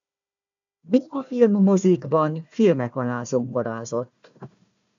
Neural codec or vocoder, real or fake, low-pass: codec, 16 kHz, 1 kbps, FunCodec, trained on Chinese and English, 50 frames a second; fake; 7.2 kHz